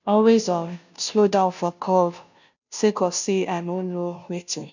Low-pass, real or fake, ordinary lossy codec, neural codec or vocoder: 7.2 kHz; fake; none; codec, 16 kHz, 0.5 kbps, FunCodec, trained on Chinese and English, 25 frames a second